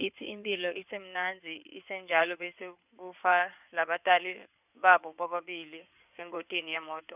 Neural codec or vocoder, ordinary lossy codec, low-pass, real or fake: codec, 16 kHz in and 24 kHz out, 2.2 kbps, FireRedTTS-2 codec; none; 3.6 kHz; fake